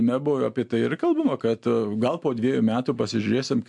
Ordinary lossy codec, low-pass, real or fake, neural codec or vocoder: MP3, 64 kbps; 10.8 kHz; real; none